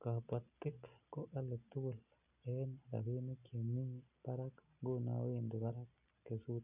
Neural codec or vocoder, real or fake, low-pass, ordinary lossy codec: none; real; 3.6 kHz; none